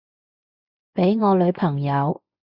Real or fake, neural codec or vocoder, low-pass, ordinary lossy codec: fake; vocoder, 24 kHz, 100 mel bands, Vocos; 5.4 kHz; Opus, 64 kbps